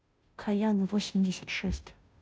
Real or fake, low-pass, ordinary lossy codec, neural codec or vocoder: fake; none; none; codec, 16 kHz, 0.5 kbps, FunCodec, trained on Chinese and English, 25 frames a second